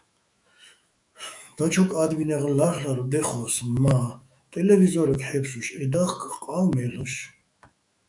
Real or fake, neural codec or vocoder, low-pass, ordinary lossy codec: fake; autoencoder, 48 kHz, 128 numbers a frame, DAC-VAE, trained on Japanese speech; 10.8 kHz; MP3, 96 kbps